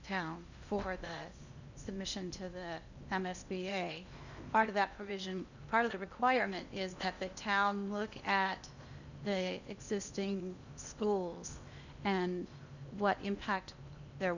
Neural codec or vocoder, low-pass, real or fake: codec, 16 kHz in and 24 kHz out, 0.8 kbps, FocalCodec, streaming, 65536 codes; 7.2 kHz; fake